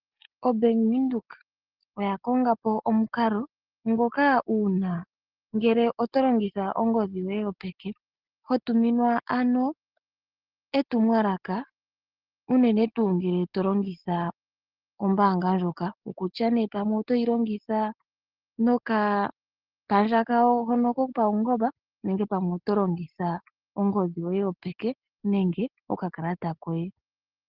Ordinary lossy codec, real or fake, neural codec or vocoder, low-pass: Opus, 16 kbps; real; none; 5.4 kHz